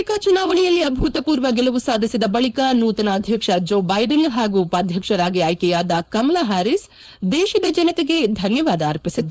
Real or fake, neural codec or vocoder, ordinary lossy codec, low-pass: fake; codec, 16 kHz, 4.8 kbps, FACodec; none; none